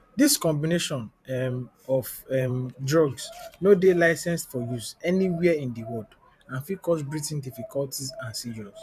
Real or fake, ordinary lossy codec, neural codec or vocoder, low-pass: fake; none; vocoder, 44.1 kHz, 128 mel bands every 512 samples, BigVGAN v2; 14.4 kHz